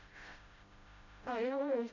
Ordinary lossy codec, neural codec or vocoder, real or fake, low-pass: AAC, 48 kbps; codec, 16 kHz, 0.5 kbps, FreqCodec, smaller model; fake; 7.2 kHz